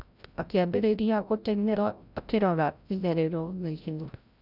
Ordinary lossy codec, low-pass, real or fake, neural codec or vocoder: none; 5.4 kHz; fake; codec, 16 kHz, 0.5 kbps, FreqCodec, larger model